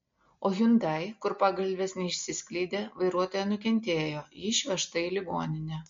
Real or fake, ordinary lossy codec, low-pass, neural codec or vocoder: real; MP3, 48 kbps; 7.2 kHz; none